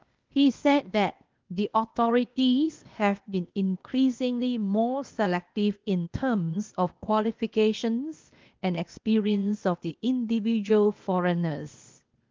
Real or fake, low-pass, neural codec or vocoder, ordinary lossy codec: fake; 7.2 kHz; codec, 16 kHz, 0.8 kbps, ZipCodec; Opus, 32 kbps